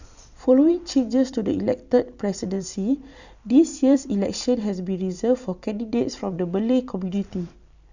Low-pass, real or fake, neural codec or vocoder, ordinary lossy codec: 7.2 kHz; fake; vocoder, 44.1 kHz, 128 mel bands every 512 samples, BigVGAN v2; none